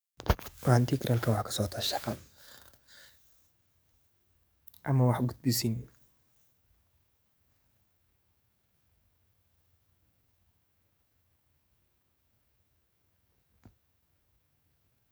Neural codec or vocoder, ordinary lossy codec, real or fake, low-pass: codec, 44.1 kHz, 7.8 kbps, DAC; none; fake; none